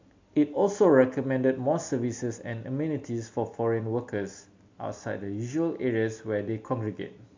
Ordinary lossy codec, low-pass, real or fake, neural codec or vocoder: MP3, 48 kbps; 7.2 kHz; real; none